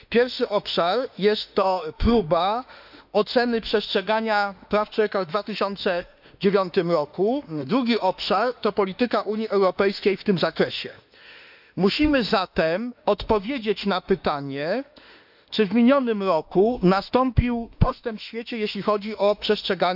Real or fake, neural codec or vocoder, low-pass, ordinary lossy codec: fake; autoencoder, 48 kHz, 32 numbers a frame, DAC-VAE, trained on Japanese speech; 5.4 kHz; none